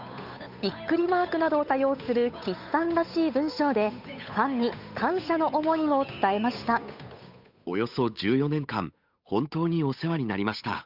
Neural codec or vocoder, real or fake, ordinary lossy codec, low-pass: codec, 16 kHz, 8 kbps, FunCodec, trained on Chinese and English, 25 frames a second; fake; none; 5.4 kHz